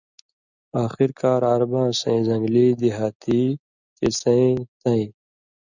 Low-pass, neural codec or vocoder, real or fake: 7.2 kHz; none; real